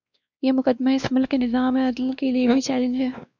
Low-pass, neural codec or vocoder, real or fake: 7.2 kHz; codec, 16 kHz, 2 kbps, X-Codec, WavLM features, trained on Multilingual LibriSpeech; fake